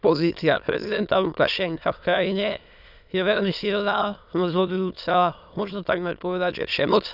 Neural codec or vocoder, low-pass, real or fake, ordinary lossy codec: autoencoder, 22.05 kHz, a latent of 192 numbers a frame, VITS, trained on many speakers; 5.4 kHz; fake; none